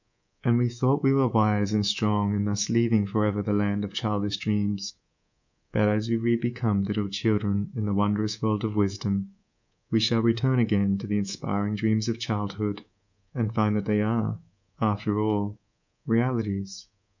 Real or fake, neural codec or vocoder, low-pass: fake; codec, 24 kHz, 3.1 kbps, DualCodec; 7.2 kHz